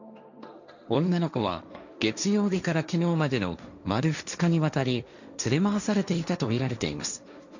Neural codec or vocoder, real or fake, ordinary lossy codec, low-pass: codec, 16 kHz, 1.1 kbps, Voila-Tokenizer; fake; none; 7.2 kHz